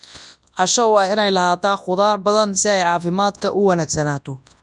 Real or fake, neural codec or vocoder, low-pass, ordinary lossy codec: fake; codec, 24 kHz, 0.9 kbps, WavTokenizer, large speech release; 10.8 kHz; none